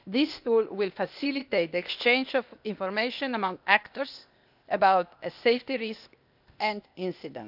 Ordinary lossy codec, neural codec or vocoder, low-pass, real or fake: none; codec, 16 kHz, 0.8 kbps, ZipCodec; 5.4 kHz; fake